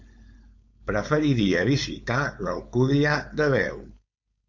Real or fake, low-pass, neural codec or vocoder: fake; 7.2 kHz; codec, 16 kHz, 4.8 kbps, FACodec